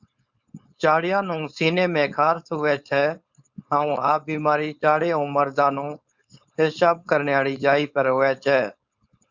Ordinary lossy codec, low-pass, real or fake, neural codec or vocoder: Opus, 64 kbps; 7.2 kHz; fake; codec, 16 kHz, 4.8 kbps, FACodec